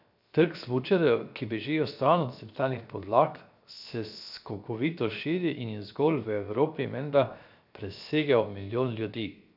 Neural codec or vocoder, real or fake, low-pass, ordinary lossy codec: codec, 16 kHz, 0.7 kbps, FocalCodec; fake; 5.4 kHz; none